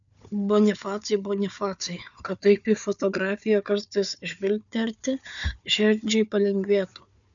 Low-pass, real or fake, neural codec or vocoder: 7.2 kHz; fake; codec, 16 kHz, 4 kbps, FunCodec, trained on Chinese and English, 50 frames a second